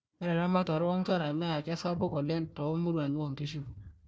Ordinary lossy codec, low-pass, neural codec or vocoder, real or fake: none; none; codec, 16 kHz, 1 kbps, FunCodec, trained on Chinese and English, 50 frames a second; fake